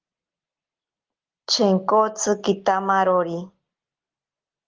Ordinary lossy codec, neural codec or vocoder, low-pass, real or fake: Opus, 16 kbps; none; 7.2 kHz; real